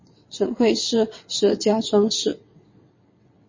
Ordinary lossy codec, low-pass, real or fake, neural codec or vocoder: MP3, 32 kbps; 7.2 kHz; fake; vocoder, 22.05 kHz, 80 mel bands, WaveNeXt